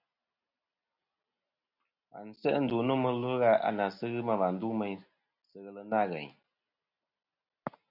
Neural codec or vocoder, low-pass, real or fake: none; 5.4 kHz; real